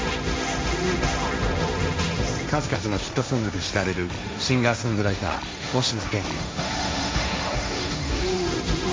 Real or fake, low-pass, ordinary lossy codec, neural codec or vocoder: fake; none; none; codec, 16 kHz, 1.1 kbps, Voila-Tokenizer